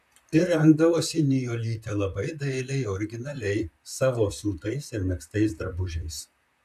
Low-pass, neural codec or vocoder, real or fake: 14.4 kHz; vocoder, 44.1 kHz, 128 mel bands, Pupu-Vocoder; fake